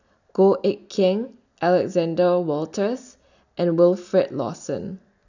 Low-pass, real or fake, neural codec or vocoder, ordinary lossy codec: 7.2 kHz; real; none; none